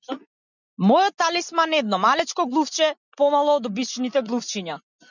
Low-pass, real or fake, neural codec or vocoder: 7.2 kHz; real; none